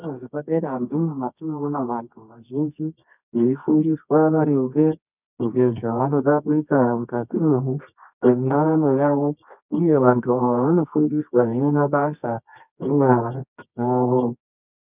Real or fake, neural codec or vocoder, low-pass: fake; codec, 24 kHz, 0.9 kbps, WavTokenizer, medium music audio release; 3.6 kHz